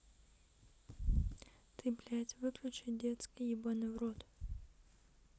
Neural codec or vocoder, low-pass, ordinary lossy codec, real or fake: none; none; none; real